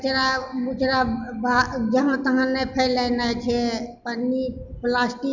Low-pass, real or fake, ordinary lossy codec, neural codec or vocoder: 7.2 kHz; real; none; none